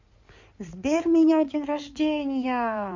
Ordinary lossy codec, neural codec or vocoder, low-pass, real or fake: MP3, 48 kbps; codec, 16 kHz in and 24 kHz out, 2.2 kbps, FireRedTTS-2 codec; 7.2 kHz; fake